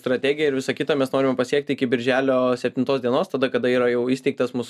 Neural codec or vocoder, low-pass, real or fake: none; 14.4 kHz; real